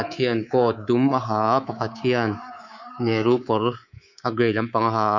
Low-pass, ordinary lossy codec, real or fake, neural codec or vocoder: 7.2 kHz; none; fake; codec, 24 kHz, 3.1 kbps, DualCodec